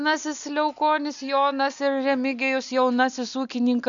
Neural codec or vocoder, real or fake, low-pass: none; real; 7.2 kHz